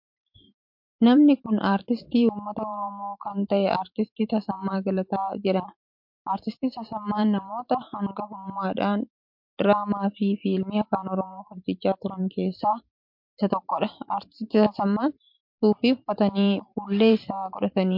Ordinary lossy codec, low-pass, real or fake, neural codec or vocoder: MP3, 48 kbps; 5.4 kHz; fake; vocoder, 44.1 kHz, 128 mel bands every 512 samples, BigVGAN v2